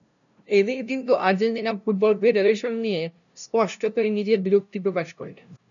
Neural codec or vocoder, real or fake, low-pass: codec, 16 kHz, 0.5 kbps, FunCodec, trained on LibriTTS, 25 frames a second; fake; 7.2 kHz